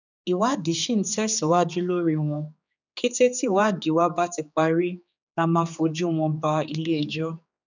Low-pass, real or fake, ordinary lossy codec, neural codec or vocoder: 7.2 kHz; fake; none; codec, 16 kHz, 4 kbps, X-Codec, HuBERT features, trained on general audio